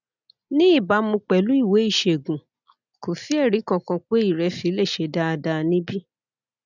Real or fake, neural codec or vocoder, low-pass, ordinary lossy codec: real; none; 7.2 kHz; none